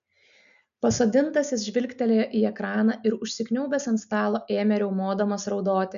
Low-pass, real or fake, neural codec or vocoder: 7.2 kHz; real; none